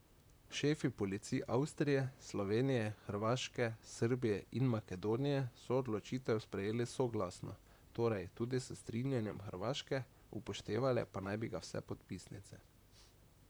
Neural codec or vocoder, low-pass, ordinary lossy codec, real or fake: vocoder, 44.1 kHz, 128 mel bands, Pupu-Vocoder; none; none; fake